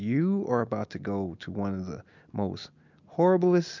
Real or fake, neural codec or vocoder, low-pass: real; none; 7.2 kHz